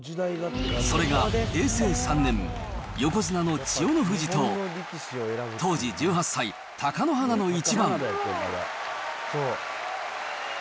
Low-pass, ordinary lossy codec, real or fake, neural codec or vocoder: none; none; real; none